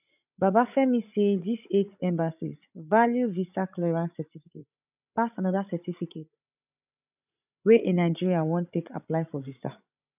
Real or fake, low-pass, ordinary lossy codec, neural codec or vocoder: fake; 3.6 kHz; none; codec, 16 kHz, 16 kbps, FreqCodec, larger model